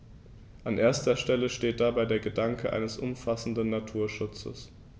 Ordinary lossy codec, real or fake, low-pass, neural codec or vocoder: none; real; none; none